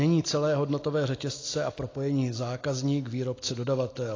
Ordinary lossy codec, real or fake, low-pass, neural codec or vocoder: AAC, 32 kbps; real; 7.2 kHz; none